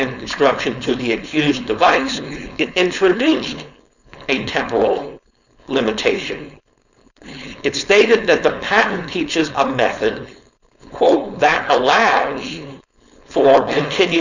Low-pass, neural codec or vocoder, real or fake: 7.2 kHz; codec, 16 kHz, 4.8 kbps, FACodec; fake